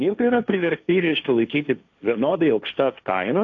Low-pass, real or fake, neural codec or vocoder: 7.2 kHz; fake; codec, 16 kHz, 1.1 kbps, Voila-Tokenizer